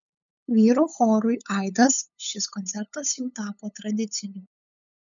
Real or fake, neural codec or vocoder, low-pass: fake; codec, 16 kHz, 8 kbps, FunCodec, trained on LibriTTS, 25 frames a second; 7.2 kHz